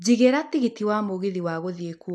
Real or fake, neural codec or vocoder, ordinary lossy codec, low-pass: real; none; none; none